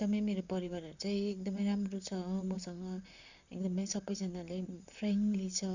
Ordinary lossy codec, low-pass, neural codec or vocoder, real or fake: none; 7.2 kHz; vocoder, 44.1 kHz, 128 mel bands, Pupu-Vocoder; fake